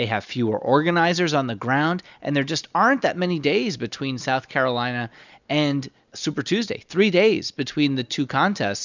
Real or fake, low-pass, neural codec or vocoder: real; 7.2 kHz; none